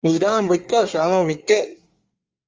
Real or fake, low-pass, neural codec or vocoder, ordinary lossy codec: fake; 7.2 kHz; codec, 24 kHz, 1 kbps, SNAC; Opus, 32 kbps